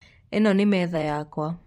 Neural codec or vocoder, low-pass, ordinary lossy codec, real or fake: vocoder, 44.1 kHz, 128 mel bands every 512 samples, BigVGAN v2; 19.8 kHz; MP3, 64 kbps; fake